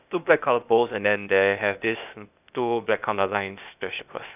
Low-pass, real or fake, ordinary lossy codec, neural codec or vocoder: 3.6 kHz; fake; none; codec, 16 kHz, 0.8 kbps, ZipCodec